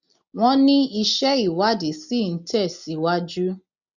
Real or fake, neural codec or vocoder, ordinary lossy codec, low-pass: real; none; none; 7.2 kHz